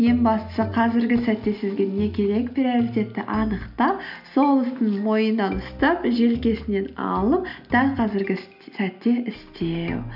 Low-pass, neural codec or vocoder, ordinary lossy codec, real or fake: 5.4 kHz; none; none; real